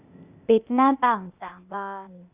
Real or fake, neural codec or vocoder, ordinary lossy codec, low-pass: fake; codec, 16 kHz, 0.8 kbps, ZipCodec; Opus, 64 kbps; 3.6 kHz